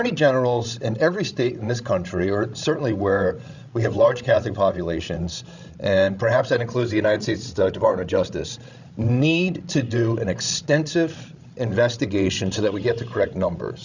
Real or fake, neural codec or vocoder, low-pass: fake; codec, 16 kHz, 16 kbps, FreqCodec, larger model; 7.2 kHz